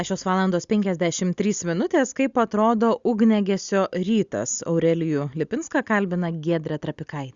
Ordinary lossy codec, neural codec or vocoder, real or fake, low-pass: Opus, 64 kbps; none; real; 7.2 kHz